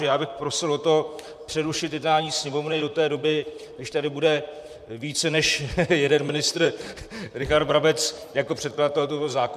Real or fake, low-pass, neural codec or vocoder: fake; 14.4 kHz; vocoder, 44.1 kHz, 128 mel bands, Pupu-Vocoder